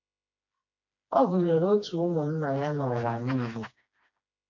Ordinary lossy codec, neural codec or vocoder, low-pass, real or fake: none; codec, 16 kHz, 2 kbps, FreqCodec, smaller model; 7.2 kHz; fake